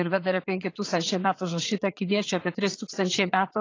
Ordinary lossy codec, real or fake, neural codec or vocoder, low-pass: AAC, 32 kbps; fake; vocoder, 44.1 kHz, 80 mel bands, Vocos; 7.2 kHz